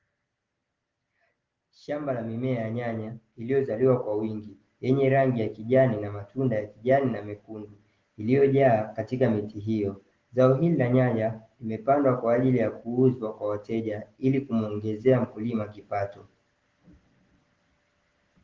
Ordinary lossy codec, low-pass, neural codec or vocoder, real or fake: Opus, 16 kbps; 7.2 kHz; none; real